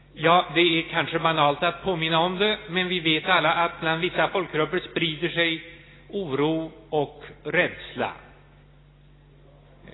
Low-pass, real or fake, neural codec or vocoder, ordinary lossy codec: 7.2 kHz; real; none; AAC, 16 kbps